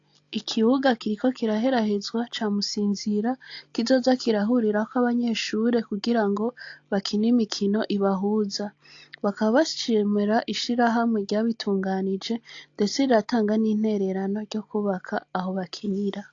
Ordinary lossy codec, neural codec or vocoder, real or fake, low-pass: AAC, 48 kbps; none; real; 7.2 kHz